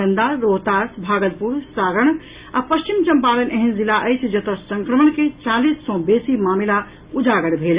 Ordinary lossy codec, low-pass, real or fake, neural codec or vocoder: Opus, 64 kbps; 3.6 kHz; real; none